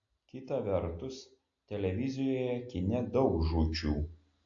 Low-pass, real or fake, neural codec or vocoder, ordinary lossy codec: 7.2 kHz; real; none; AAC, 48 kbps